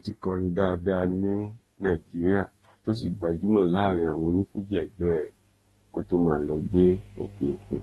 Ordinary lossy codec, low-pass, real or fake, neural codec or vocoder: AAC, 32 kbps; 19.8 kHz; fake; codec, 44.1 kHz, 2.6 kbps, DAC